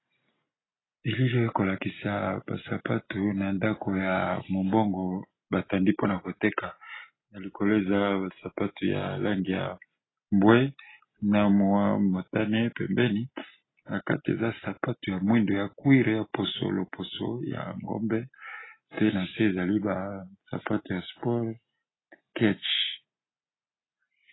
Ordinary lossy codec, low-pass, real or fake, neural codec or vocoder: AAC, 16 kbps; 7.2 kHz; real; none